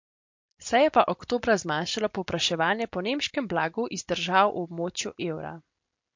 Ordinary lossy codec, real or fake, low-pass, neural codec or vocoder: MP3, 48 kbps; real; 7.2 kHz; none